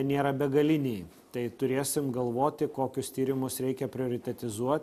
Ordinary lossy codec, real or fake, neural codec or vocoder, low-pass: AAC, 96 kbps; real; none; 14.4 kHz